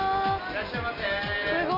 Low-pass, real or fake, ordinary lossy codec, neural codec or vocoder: 5.4 kHz; real; none; none